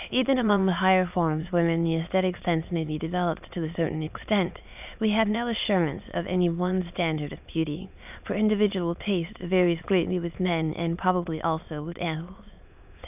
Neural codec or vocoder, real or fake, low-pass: autoencoder, 22.05 kHz, a latent of 192 numbers a frame, VITS, trained on many speakers; fake; 3.6 kHz